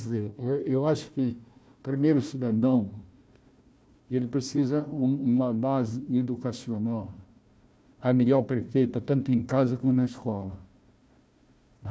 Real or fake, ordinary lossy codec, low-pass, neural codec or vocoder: fake; none; none; codec, 16 kHz, 1 kbps, FunCodec, trained on Chinese and English, 50 frames a second